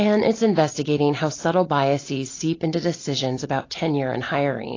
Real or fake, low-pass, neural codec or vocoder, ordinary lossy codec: real; 7.2 kHz; none; AAC, 32 kbps